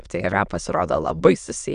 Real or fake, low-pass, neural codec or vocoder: fake; 9.9 kHz; autoencoder, 22.05 kHz, a latent of 192 numbers a frame, VITS, trained on many speakers